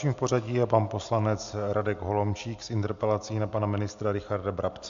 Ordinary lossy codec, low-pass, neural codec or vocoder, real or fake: MP3, 64 kbps; 7.2 kHz; none; real